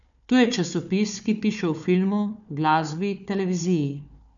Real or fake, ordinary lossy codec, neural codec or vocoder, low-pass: fake; none; codec, 16 kHz, 4 kbps, FunCodec, trained on Chinese and English, 50 frames a second; 7.2 kHz